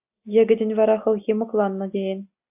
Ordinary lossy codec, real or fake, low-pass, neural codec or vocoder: AAC, 32 kbps; real; 3.6 kHz; none